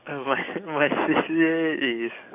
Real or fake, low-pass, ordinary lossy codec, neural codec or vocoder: fake; 3.6 kHz; none; codec, 44.1 kHz, 7.8 kbps, DAC